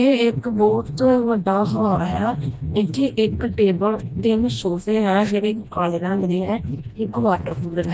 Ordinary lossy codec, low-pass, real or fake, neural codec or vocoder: none; none; fake; codec, 16 kHz, 1 kbps, FreqCodec, smaller model